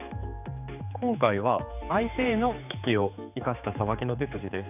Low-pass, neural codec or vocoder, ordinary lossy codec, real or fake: 3.6 kHz; codec, 16 kHz, 4 kbps, X-Codec, HuBERT features, trained on general audio; none; fake